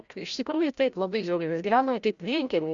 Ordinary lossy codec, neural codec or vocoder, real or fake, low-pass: Opus, 64 kbps; codec, 16 kHz, 0.5 kbps, FreqCodec, larger model; fake; 7.2 kHz